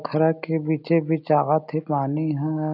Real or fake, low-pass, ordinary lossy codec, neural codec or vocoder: real; 5.4 kHz; none; none